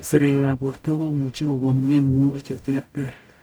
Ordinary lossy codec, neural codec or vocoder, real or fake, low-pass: none; codec, 44.1 kHz, 0.9 kbps, DAC; fake; none